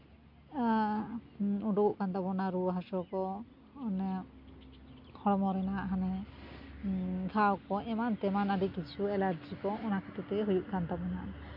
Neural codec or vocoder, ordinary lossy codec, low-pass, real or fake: none; MP3, 48 kbps; 5.4 kHz; real